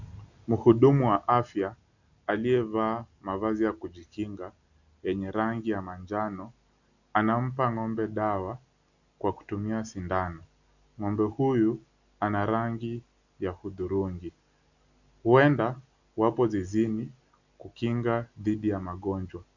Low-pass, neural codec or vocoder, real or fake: 7.2 kHz; none; real